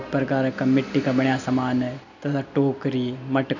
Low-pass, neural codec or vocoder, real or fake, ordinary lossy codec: 7.2 kHz; none; real; none